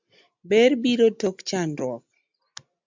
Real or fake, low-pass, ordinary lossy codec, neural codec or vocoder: real; 7.2 kHz; MP3, 64 kbps; none